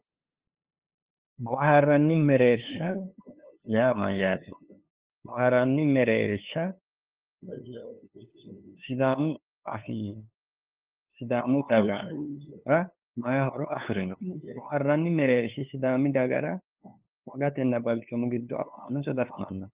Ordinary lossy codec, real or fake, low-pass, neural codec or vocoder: Opus, 32 kbps; fake; 3.6 kHz; codec, 16 kHz, 2 kbps, FunCodec, trained on LibriTTS, 25 frames a second